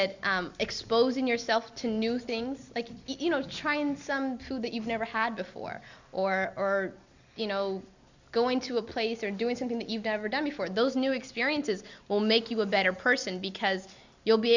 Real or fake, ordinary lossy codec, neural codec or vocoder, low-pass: real; Opus, 64 kbps; none; 7.2 kHz